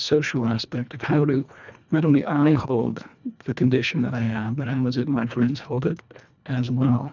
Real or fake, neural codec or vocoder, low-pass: fake; codec, 24 kHz, 1.5 kbps, HILCodec; 7.2 kHz